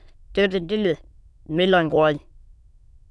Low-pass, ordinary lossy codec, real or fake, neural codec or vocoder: none; none; fake; autoencoder, 22.05 kHz, a latent of 192 numbers a frame, VITS, trained on many speakers